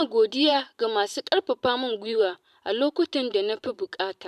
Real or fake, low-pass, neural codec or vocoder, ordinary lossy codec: fake; 14.4 kHz; vocoder, 44.1 kHz, 128 mel bands every 512 samples, BigVGAN v2; none